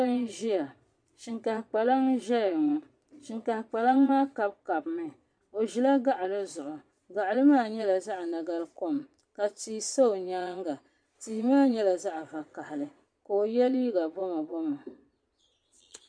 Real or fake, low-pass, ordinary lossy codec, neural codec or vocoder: fake; 9.9 kHz; MP3, 64 kbps; vocoder, 22.05 kHz, 80 mel bands, Vocos